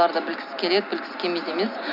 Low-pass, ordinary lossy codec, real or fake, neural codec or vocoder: 5.4 kHz; none; real; none